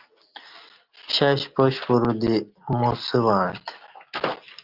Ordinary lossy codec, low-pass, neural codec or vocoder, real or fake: Opus, 24 kbps; 5.4 kHz; none; real